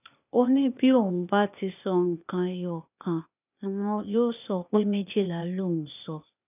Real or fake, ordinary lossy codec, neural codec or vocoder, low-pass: fake; none; codec, 16 kHz, 0.8 kbps, ZipCodec; 3.6 kHz